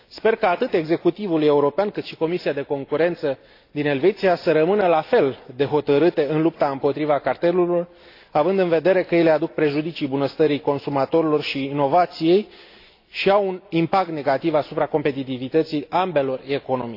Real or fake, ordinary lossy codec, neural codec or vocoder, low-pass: real; AAC, 32 kbps; none; 5.4 kHz